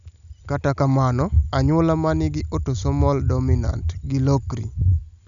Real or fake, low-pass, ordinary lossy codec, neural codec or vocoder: real; 7.2 kHz; none; none